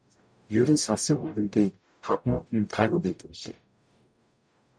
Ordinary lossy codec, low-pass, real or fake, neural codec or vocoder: MP3, 96 kbps; 9.9 kHz; fake; codec, 44.1 kHz, 0.9 kbps, DAC